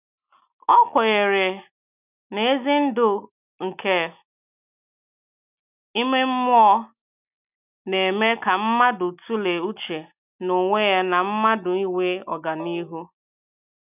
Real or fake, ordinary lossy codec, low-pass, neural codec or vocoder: real; none; 3.6 kHz; none